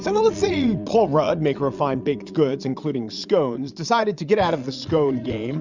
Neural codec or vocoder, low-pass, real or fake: vocoder, 22.05 kHz, 80 mel bands, Vocos; 7.2 kHz; fake